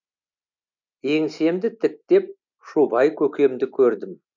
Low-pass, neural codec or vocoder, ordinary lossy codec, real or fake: 7.2 kHz; none; none; real